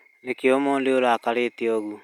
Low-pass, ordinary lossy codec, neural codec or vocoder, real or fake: 19.8 kHz; none; none; real